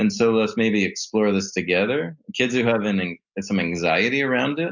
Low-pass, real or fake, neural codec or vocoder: 7.2 kHz; real; none